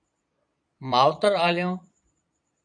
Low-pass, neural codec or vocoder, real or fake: 9.9 kHz; vocoder, 22.05 kHz, 80 mel bands, Vocos; fake